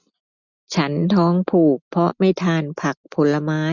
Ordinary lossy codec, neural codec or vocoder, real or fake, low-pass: none; none; real; 7.2 kHz